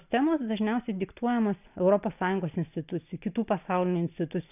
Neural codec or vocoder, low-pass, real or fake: none; 3.6 kHz; real